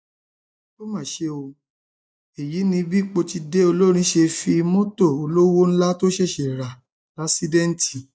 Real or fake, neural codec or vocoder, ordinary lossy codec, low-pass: real; none; none; none